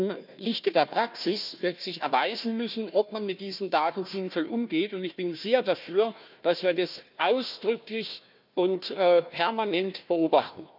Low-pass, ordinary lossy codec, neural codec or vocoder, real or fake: 5.4 kHz; none; codec, 16 kHz, 1 kbps, FunCodec, trained on Chinese and English, 50 frames a second; fake